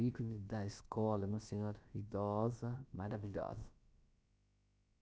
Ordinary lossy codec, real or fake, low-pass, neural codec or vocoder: none; fake; none; codec, 16 kHz, about 1 kbps, DyCAST, with the encoder's durations